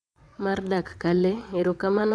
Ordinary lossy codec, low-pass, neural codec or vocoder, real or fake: none; none; vocoder, 22.05 kHz, 80 mel bands, WaveNeXt; fake